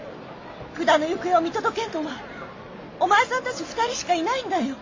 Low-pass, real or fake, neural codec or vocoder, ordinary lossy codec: 7.2 kHz; real; none; AAC, 32 kbps